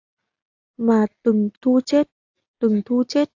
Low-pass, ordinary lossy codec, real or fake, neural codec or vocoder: 7.2 kHz; AAC, 48 kbps; real; none